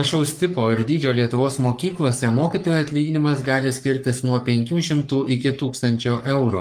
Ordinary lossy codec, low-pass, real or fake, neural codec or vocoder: Opus, 32 kbps; 14.4 kHz; fake; codec, 44.1 kHz, 3.4 kbps, Pupu-Codec